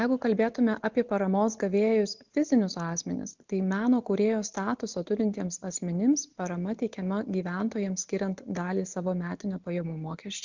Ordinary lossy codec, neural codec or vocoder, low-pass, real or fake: MP3, 64 kbps; none; 7.2 kHz; real